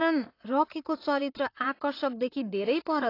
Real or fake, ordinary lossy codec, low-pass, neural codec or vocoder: fake; AAC, 32 kbps; 5.4 kHz; vocoder, 44.1 kHz, 128 mel bands, Pupu-Vocoder